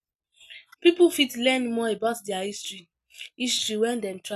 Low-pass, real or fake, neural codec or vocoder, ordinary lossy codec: 14.4 kHz; real; none; none